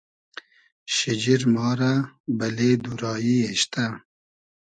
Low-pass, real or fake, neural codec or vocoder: 9.9 kHz; real; none